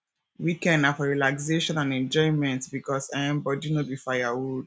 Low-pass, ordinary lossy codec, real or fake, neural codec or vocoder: none; none; real; none